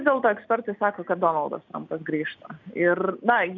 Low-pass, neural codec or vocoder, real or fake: 7.2 kHz; none; real